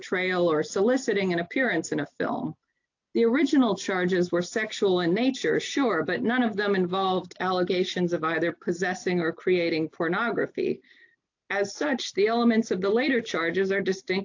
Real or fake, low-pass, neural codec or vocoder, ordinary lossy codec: real; 7.2 kHz; none; AAC, 48 kbps